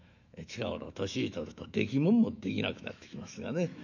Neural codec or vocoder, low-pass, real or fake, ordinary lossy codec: autoencoder, 48 kHz, 128 numbers a frame, DAC-VAE, trained on Japanese speech; 7.2 kHz; fake; none